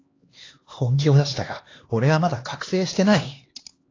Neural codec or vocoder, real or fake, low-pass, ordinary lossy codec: codec, 16 kHz, 2 kbps, X-Codec, HuBERT features, trained on LibriSpeech; fake; 7.2 kHz; AAC, 32 kbps